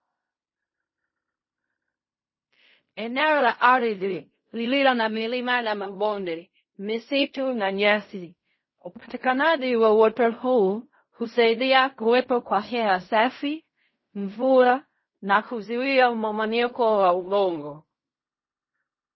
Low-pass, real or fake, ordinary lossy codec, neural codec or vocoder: 7.2 kHz; fake; MP3, 24 kbps; codec, 16 kHz in and 24 kHz out, 0.4 kbps, LongCat-Audio-Codec, fine tuned four codebook decoder